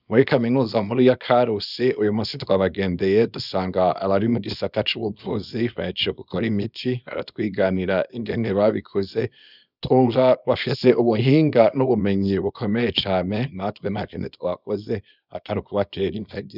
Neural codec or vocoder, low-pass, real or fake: codec, 24 kHz, 0.9 kbps, WavTokenizer, small release; 5.4 kHz; fake